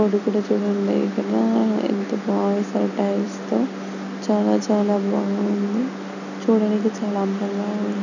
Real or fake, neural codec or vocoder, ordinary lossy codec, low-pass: real; none; none; 7.2 kHz